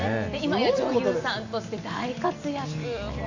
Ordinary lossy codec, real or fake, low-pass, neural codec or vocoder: none; real; 7.2 kHz; none